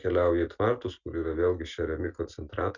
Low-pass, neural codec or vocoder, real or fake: 7.2 kHz; none; real